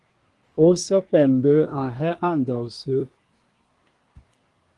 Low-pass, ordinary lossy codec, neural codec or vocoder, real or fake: 10.8 kHz; Opus, 24 kbps; codec, 24 kHz, 1 kbps, SNAC; fake